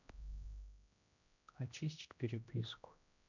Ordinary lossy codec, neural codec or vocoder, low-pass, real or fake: none; codec, 16 kHz, 1 kbps, X-Codec, HuBERT features, trained on general audio; 7.2 kHz; fake